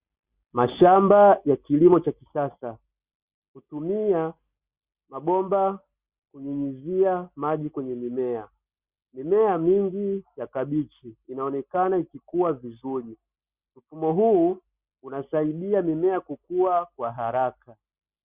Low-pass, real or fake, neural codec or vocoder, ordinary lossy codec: 3.6 kHz; real; none; Opus, 64 kbps